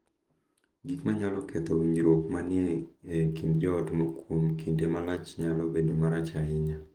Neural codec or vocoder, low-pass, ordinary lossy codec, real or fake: codec, 44.1 kHz, 7.8 kbps, DAC; 19.8 kHz; Opus, 24 kbps; fake